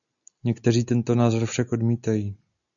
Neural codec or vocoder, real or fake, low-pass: none; real; 7.2 kHz